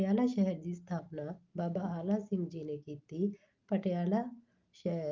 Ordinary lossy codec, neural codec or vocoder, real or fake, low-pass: Opus, 32 kbps; none; real; 7.2 kHz